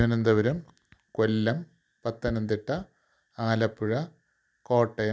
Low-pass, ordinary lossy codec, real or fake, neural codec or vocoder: none; none; real; none